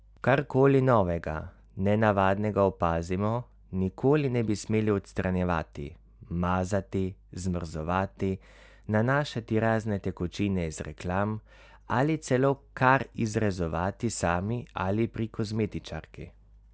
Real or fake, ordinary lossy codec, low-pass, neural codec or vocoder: real; none; none; none